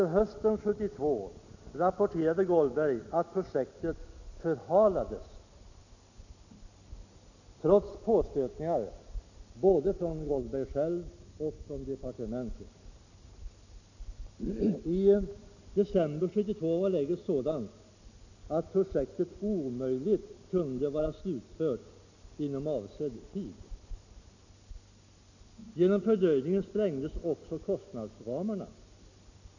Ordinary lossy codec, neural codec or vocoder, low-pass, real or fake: none; none; 7.2 kHz; real